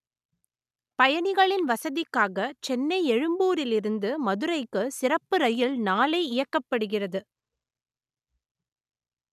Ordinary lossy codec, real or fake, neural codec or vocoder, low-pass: none; real; none; 14.4 kHz